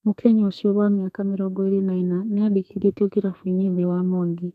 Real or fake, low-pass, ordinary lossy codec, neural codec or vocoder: fake; 14.4 kHz; none; codec, 32 kHz, 1.9 kbps, SNAC